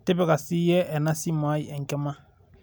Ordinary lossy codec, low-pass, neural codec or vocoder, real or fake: none; none; none; real